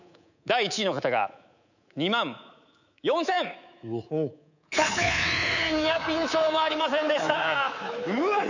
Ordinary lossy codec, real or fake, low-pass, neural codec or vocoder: none; fake; 7.2 kHz; codec, 24 kHz, 3.1 kbps, DualCodec